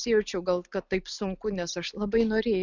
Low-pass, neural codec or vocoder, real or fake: 7.2 kHz; none; real